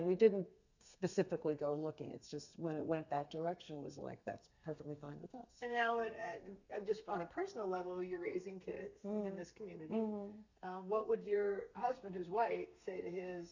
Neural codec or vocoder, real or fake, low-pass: codec, 32 kHz, 1.9 kbps, SNAC; fake; 7.2 kHz